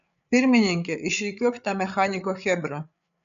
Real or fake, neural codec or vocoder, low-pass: fake; codec, 16 kHz, 8 kbps, FreqCodec, larger model; 7.2 kHz